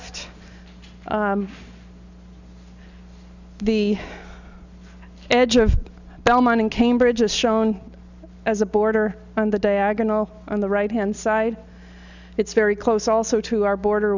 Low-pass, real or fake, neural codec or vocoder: 7.2 kHz; real; none